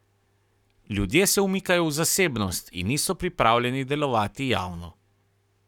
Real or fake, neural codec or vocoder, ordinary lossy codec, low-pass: fake; codec, 44.1 kHz, 7.8 kbps, Pupu-Codec; none; 19.8 kHz